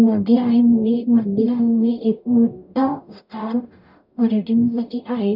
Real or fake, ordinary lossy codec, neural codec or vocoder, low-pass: fake; none; codec, 44.1 kHz, 0.9 kbps, DAC; 5.4 kHz